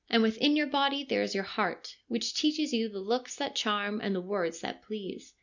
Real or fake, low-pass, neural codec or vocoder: real; 7.2 kHz; none